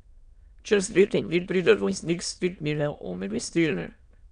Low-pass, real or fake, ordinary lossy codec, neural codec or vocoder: 9.9 kHz; fake; none; autoencoder, 22.05 kHz, a latent of 192 numbers a frame, VITS, trained on many speakers